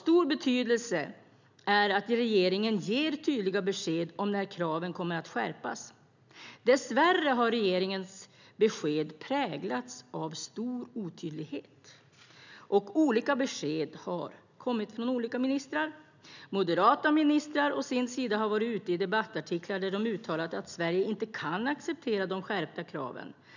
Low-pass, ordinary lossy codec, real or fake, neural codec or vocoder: 7.2 kHz; none; real; none